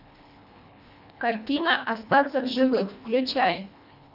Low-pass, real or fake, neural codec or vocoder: 5.4 kHz; fake; codec, 24 kHz, 1.5 kbps, HILCodec